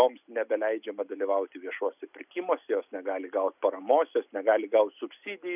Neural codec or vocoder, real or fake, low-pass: none; real; 3.6 kHz